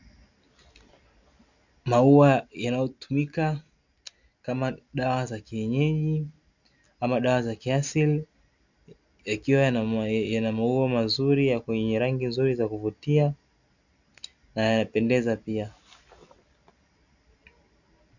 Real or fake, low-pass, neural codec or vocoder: real; 7.2 kHz; none